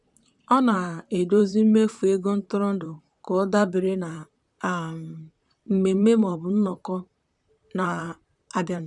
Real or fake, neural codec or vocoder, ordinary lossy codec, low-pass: fake; vocoder, 44.1 kHz, 128 mel bands, Pupu-Vocoder; none; 10.8 kHz